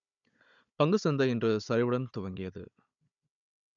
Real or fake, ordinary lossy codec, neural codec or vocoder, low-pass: fake; MP3, 96 kbps; codec, 16 kHz, 16 kbps, FunCodec, trained on Chinese and English, 50 frames a second; 7.2 kHz